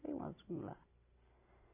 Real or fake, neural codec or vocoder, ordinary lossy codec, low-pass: real; none; none; 3.6 kHz